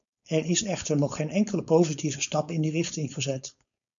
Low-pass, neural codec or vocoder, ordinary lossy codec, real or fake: 7.2 kHz; codec, 16 kHz, 4.8 kbps, FACodec; MP3, 96 kbps; fake